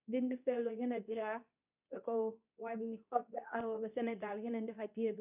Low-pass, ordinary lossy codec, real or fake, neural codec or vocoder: 3.6 kHz; none; fake; codec, 24 kHz, 0.9 kbps, WavTokenizer, medium speech release version 2